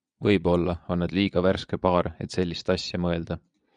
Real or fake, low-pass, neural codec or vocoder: fake; 9.9 kHz; vocoder, 22.05 kHz, 80 mel bands, Vocos